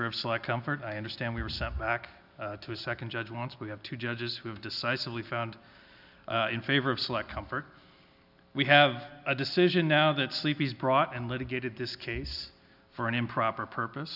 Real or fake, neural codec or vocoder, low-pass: real; none; 5.4 kHz